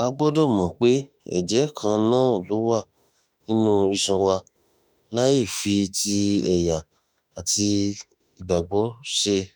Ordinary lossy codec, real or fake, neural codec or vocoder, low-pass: none; fake; autoencoder, 48 kHz, 32 numbers a frame, DAC-VAE, trained on Japanese speech; none